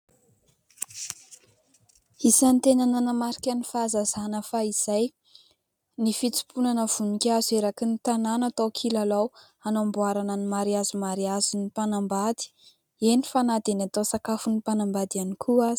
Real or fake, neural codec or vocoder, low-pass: real; none; 19.8 kHz